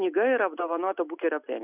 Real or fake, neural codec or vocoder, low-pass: real; none; 3.6 kHz